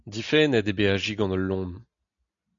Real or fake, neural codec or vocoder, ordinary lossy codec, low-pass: real; none; MP3, 96 kbps; 7.2 kHz